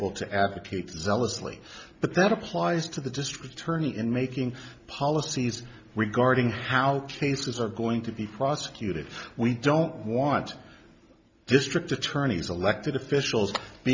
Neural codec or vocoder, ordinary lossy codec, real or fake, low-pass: none; MP3, 64 kbps; real; 7.2 kHz